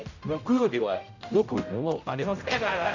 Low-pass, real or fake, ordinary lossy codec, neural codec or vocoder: 7.2 kHz; fake; none; codec, 16 kHz, 0.5 kbps, X-Codec, HuBERT features, trained on general audio